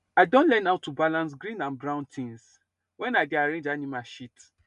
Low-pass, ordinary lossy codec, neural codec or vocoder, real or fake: 10.8 kHz; none; none; real